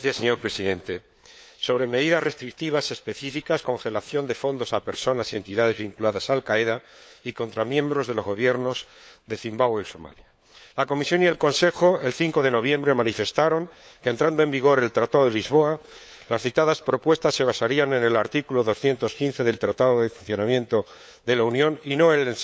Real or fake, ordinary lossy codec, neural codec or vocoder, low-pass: fake; none; codec, 16 kHz, 4 kbps, FunCodec, trained on LibriTTS, 50 frames a second; none